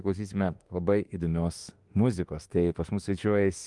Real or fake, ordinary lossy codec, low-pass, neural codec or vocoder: fake; Opus, 24 kbps; 10.8 kHz; autoencoder, 48 kHz, 32 numbers a frame, DAC-VAE, trained on Japanese speech